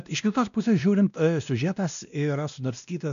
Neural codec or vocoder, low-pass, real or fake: codec, 16 kHz, 1 kbps, X-Codec, WavLM features, trained on Multilingual LibriSpeech; 7.2 kHz; fake